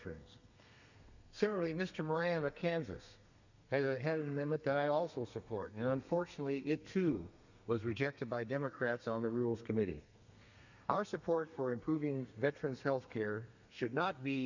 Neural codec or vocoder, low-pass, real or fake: codec, 32 kHz, 1.9 kbps, SNAC; 7.2 kHz; fake